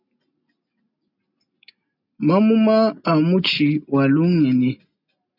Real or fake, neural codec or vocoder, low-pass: real; none; 5.4 kHz